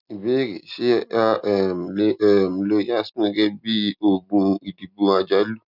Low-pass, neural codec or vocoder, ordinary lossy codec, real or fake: 5.4 kHz; none; none; real